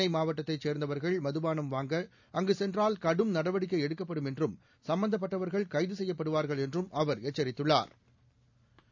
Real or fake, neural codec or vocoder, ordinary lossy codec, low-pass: real; none; none; 7.2 kHz